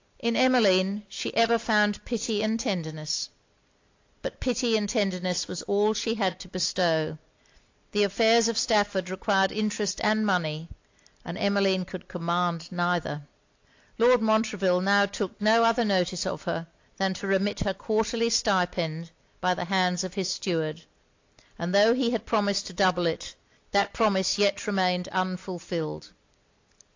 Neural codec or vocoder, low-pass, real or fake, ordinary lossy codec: none; 7.2 kHz; real; AAC, 48 kbps